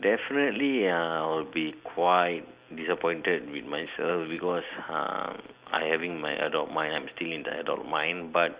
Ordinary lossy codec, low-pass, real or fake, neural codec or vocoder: Opus, 64 kbps; 3.6 kHz; real; none